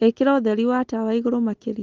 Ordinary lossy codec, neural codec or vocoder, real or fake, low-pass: Opus, 16 kbps; none; real; 7.2 kHz